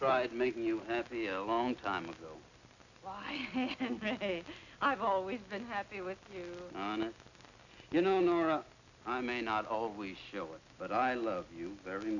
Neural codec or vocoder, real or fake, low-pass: none; real; 7.2 kHz